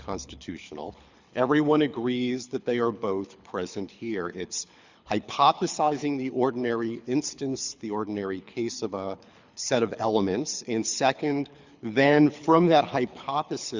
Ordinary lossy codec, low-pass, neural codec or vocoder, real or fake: Opus, 64 kbps; 7.2 kHz; codec, 24 kHz, 6 kbps, HILCodec; fake